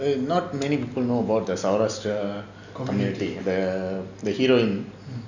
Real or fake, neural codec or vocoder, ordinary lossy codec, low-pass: real; none; none; 7.2 kHz